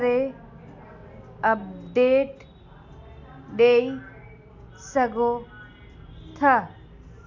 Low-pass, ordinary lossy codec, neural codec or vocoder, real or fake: 7.2 kHz; none; none; real